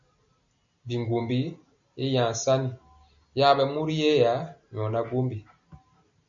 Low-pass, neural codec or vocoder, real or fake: 7.2 kHz; none; real